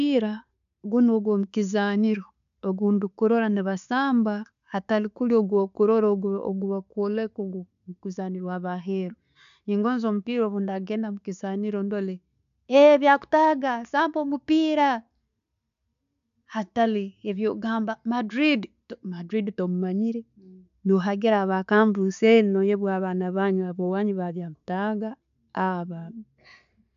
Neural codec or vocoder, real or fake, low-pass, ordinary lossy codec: none; real; 7.2 kHz; none